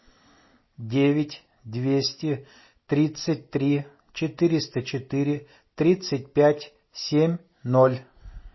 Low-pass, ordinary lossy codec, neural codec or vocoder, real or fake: 7.2 kHz; MP3, 24 kbps; none; real